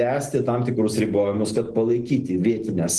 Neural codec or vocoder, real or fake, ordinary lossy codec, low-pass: none; real; Opus, 16 kbps; 10.8 kHz